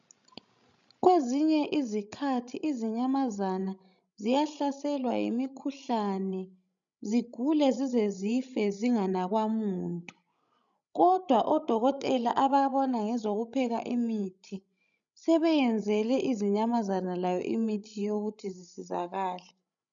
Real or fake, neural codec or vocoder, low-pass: fake; codec, 16 kHz, 16 kbps, FreqCodec, larger model; 7.2 kHz